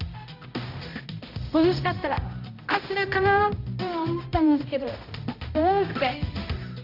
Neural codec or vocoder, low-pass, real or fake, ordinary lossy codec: codec, 16 kHz, 0.5 kbps, X-Codec, HuBERT features, trained on balanced general audio; 5.4 kHz; fake; none